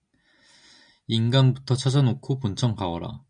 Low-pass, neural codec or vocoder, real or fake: 9.9 kHz; none; real